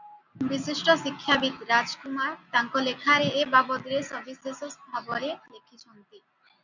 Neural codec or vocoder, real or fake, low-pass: none; real; 7.2 kHz